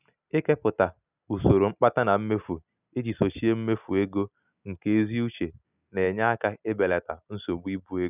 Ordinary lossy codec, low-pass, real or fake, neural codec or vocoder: none; 3.6 kHz; real; none